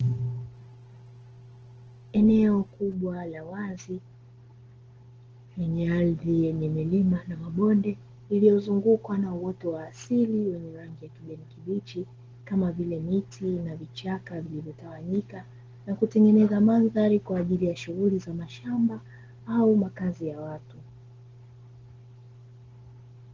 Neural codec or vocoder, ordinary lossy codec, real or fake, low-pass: none; Opus, 16 kbps; real; 7.2 kHz